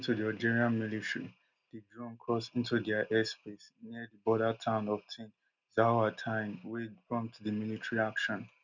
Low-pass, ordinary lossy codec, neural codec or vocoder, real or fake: 7.2 kHz; none; none; real